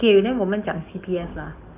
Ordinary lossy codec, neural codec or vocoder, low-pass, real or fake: none; codec, 44.1 kHz, 7.8 kbps, DAC; 3.6 kHz; fake